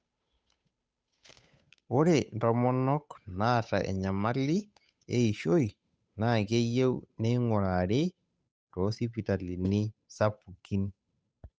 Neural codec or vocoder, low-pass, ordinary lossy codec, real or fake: codec, 16 kHz, 8 kbps, FunCodec, trained on Chinese and English, 25 frames a second; none; none; fake